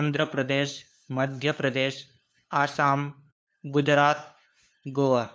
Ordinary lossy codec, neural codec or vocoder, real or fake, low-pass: none; codec, 16 kHz, 2 kbps, FunCodec, trained on LibriTTS, 25 frames a second; fake; none